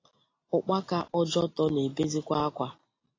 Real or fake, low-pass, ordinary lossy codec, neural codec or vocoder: real; 7.2 kHz; AAC, 32 kbps; none